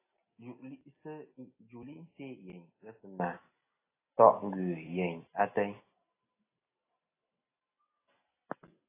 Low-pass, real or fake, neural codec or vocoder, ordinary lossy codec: 3.6 kHz; real; none; AAC, 16 kbps